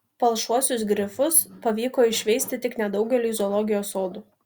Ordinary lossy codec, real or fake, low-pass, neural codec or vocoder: Opus, 64 kbps; real; 19.8 kHz; none